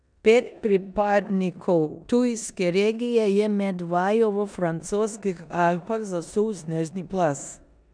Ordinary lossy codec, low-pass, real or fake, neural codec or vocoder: none; 9.9 kHz; fake; codec, 16 kHz in and 24 kHz out, 0.9 kbps, LongCat-Audio-Codec, four codebook decoder